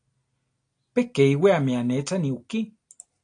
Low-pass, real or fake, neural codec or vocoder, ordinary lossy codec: 9.9 kHz; real; none; AAC, 48 kbps